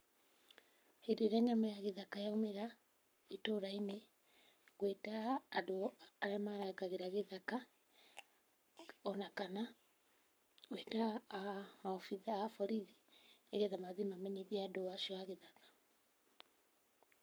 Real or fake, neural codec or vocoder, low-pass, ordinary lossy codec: fake; codec, 44.1 kHz, 7.8 kbps, Pupu-Codec; none; none